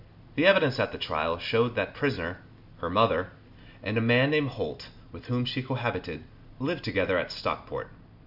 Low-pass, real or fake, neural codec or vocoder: 5.4 kHz; real; none